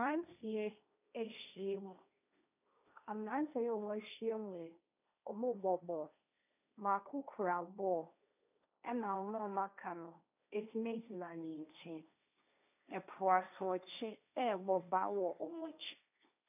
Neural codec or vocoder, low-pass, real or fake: codec, 16 kHz, 1.1 kbps, Voila-Tokenizer; 3.6 kHz; fake